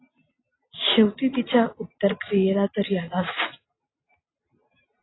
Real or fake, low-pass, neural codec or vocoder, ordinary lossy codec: real; 7.2 kHz; none; AAC, 16 kbps